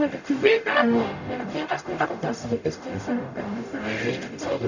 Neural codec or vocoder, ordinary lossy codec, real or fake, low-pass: codec, 44.1 kHz, 0.9 kbps, DAC; none; fake; 7.2 kHz